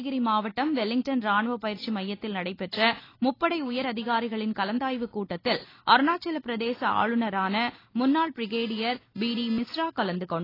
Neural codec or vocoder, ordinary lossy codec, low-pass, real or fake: none; AAC, 24 kbps; 5.4 kHz; real